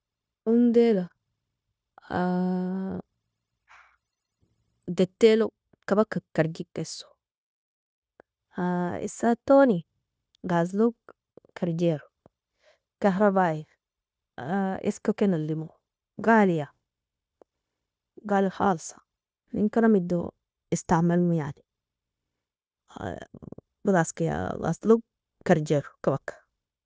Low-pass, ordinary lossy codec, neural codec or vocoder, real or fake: none; none; codec, 16 kHz, 0.9 kbps, LongCat-Audio-Codec; fake